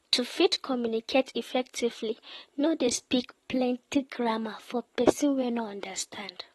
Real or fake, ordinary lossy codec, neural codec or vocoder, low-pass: fake; AAC, 32 kbps; vocoder, 44.1 kHz, 128 mel bands, Pupu-Vocoder; 19.8 kHz